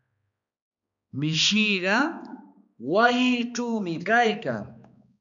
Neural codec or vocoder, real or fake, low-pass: codec, 16 kHz, 2 kbps, X-Codec, HuBERT features, trained on balanced general audio; fake; 7.2 kHz